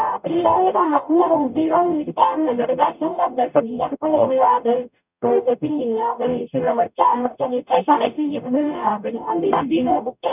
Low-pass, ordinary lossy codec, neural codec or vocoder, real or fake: 3.6 kHz; none; codec, 44.1 kHz, 0.9 kbps, DAC; fake